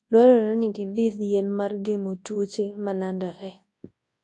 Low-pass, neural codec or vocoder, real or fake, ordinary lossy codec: 10.8 kHz; codec, 24 kHz, 0.9 kbps, WavTokenizer, large speech release; fake; AAC, 48 kbps